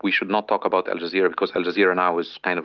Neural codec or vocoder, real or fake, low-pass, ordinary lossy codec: none; real; 7.2 kHz; Opus, 32 kbps